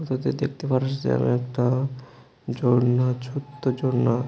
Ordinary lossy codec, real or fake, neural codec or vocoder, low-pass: none; real; none; none